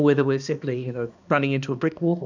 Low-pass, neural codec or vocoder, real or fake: 7.2 kHz; codec, 16 kHz, 2 kbps, X-Codec, HuBERT features, trained on balanced general audio; fake